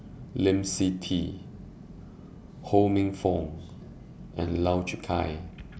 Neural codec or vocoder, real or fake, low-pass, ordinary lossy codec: none; real; none; none